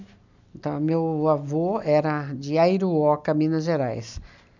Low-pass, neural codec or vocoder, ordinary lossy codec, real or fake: 7.2 kHz; none; none; real